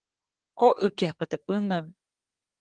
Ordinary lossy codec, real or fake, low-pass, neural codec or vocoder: Opus, 24 kbps; fake; 9.9 kHz; codec, 24 kHz, 1 kbps, SNAC